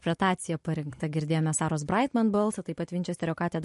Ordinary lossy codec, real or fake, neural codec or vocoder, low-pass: MP3, 48 kbps; real; none; 14.4 kHz